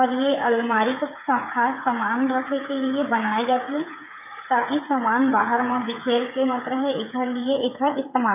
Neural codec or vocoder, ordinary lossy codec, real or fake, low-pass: codec, 16 kHz, 8 kbps, FreqCodec, smaller model; none; fake; 3.6 kHz